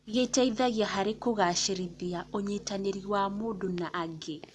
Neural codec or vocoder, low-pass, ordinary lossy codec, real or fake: none; none; none; real